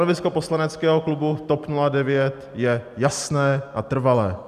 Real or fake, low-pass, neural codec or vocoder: real; 14.4 kHz; none